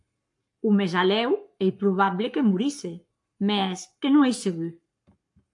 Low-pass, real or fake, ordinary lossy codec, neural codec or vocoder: 10.8 kHz; fake; AAC, 64 kbps; codec, 44.1 kHz, 7.8 kbps, Pupu-Codec